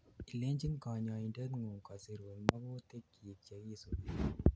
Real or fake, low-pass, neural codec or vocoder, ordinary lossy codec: real; none; none; none